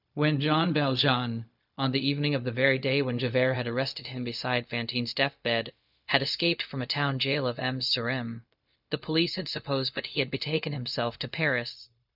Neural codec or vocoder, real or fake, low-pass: codec, 16 kHz, 0.4 kbps, LongCat-Audio-Codec; fake; 5.4 kHz